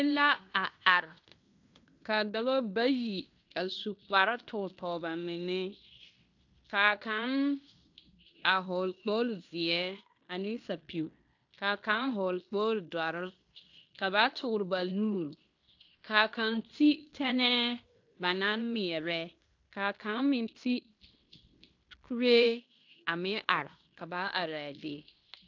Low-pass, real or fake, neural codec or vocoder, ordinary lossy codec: 7.2 kHz; fake; codec, 16 kHz in and 24 kHz out, 0.9 kbps, LongCat-Audio-Codec, fine tuned four codebook decoder; AAC, 48 kbps